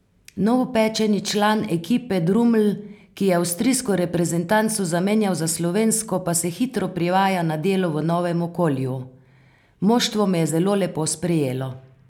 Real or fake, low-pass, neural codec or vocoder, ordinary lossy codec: real; 19.8 kHz; none; none